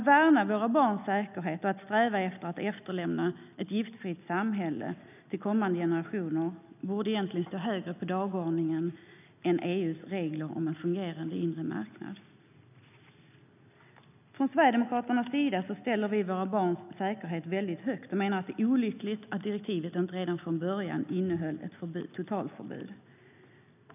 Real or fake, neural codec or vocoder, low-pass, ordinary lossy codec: real; none; 3.6 kHz; none